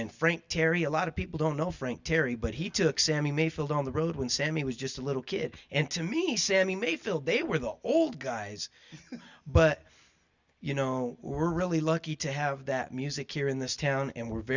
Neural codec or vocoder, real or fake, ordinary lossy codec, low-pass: none; real; Opus, 64 kbps; 7.2 kHz